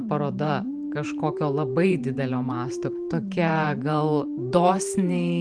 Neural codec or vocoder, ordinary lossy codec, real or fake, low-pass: vocoder, 44.1 kHz, 128 mel bands every 512 samples, BigVGAN v2; Opus, 24 kbps; fake; 9.9 kHz